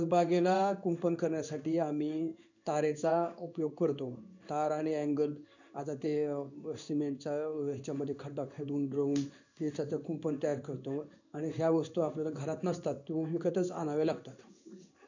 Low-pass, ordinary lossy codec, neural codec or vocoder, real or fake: 7.2 kHz; none; codec, 16 kHz in and 24 kHz out, 1 kbps, XY-Tokenizer; fake